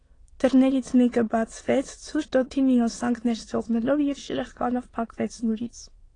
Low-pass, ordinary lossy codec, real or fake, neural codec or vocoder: 9.9 kHz; AAC, 32 kbps; fake; autoencoder, 22.05 kHz, a latent of 192 numbers a frame, VITS, trained on many speakers